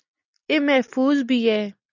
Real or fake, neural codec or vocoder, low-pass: real; none; 7.2 kHz